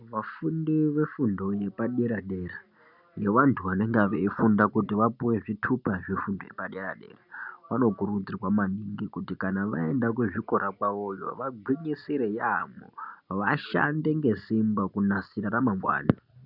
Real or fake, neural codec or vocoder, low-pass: real; none; 5.4 kHz